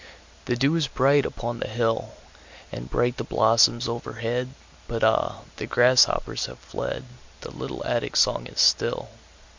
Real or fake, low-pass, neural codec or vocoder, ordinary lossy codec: real; 7.2 kHz; none; MP3, 64 kbps